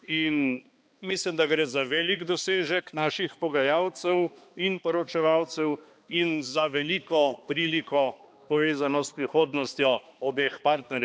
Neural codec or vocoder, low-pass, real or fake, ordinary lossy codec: codec, 16 kHz, 2 kbps, X-Codec, HuBERT features, trained on balanced general audio; none; fake; none